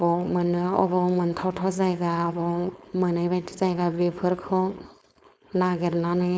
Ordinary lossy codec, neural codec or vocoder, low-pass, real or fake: none; codec, 16 kHz, 4.8 kbps, FACodec; none; fake